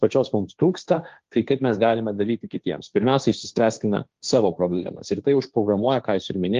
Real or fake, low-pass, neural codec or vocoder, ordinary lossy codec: fake; 7.2 kHz; codec, 16 kHz, 1.1 kbps, Voila-Tokenizer; Opus, 32 kbps